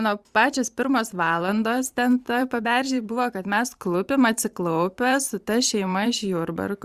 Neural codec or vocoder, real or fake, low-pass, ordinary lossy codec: vocoder, 44.1 kHz, 128 mel bands every 512 samples, BigVGAN v2; fake; 14.4 kHz; Opus, 64 kbps